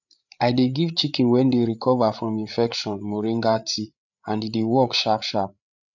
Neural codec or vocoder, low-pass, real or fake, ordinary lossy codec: codec, 16 kHz, 8 kbps, FreqCodec, larger model; 7.2 kHz; fake; none